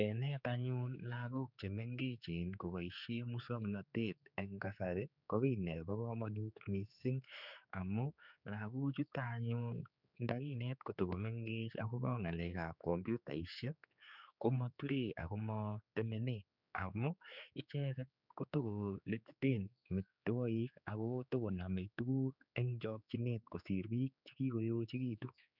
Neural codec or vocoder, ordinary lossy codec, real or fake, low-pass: codec, 16 kHz, 4 kbps, X-Codec, HuBERT features, trained on general audio; none; fake; 5.4 kHz